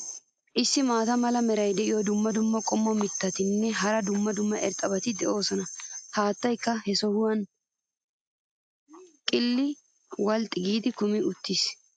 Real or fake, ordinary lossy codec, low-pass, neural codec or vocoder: real; AAC, 64 kbps; 9.9 kHz; none